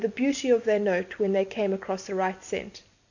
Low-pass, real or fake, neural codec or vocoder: 7.2 kHz; real; none